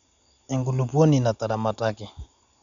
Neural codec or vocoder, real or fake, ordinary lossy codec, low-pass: none; real; none; 7.2 kHz